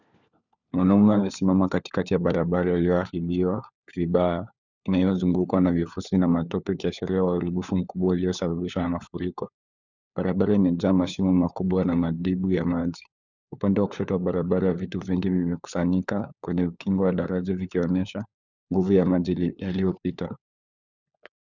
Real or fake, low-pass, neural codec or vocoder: fake; 7.2 kHz; codec, 16 kHz, 4 kbps, FunCodec, trained on LibriTTS, 50 frames a second